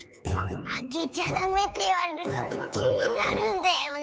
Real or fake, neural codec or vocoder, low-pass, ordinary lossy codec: fake; codec, 16 kHz, 4 kbps, X-Codec, HuBERT features, trained on LibriSpeech; none; none